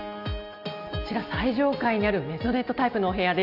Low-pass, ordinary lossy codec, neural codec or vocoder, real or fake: 5.4 kHz; none; none; real